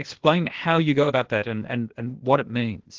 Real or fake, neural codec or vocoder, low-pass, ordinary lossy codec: fake; codec, 16 kHz, 0.8 kbps, ZipCodec; 7.2 kHz; Opus, 16 kbps